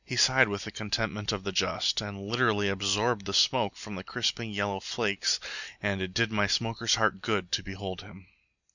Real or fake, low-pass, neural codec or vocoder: real; 7.2 kHz; none